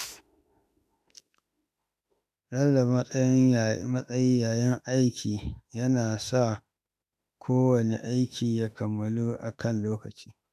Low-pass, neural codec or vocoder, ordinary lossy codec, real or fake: 14.4 kHz; autoencoder, 48 kHz, 32 numbers a frame, DAC-VAE, trained on Japanese speech; MP3, 96 kbps; fake